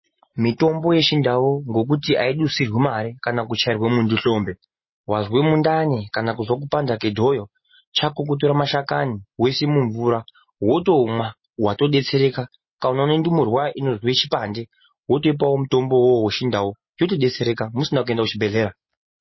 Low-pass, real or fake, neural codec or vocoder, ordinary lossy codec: 7.2 kHz; real; none; MP3, 24 kbps